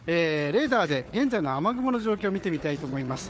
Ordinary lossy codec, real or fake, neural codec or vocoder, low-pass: none; fake; codec, 16 kHz, 4 kbps, FunCodec, trained on Chinese and English, 50 frames a second; none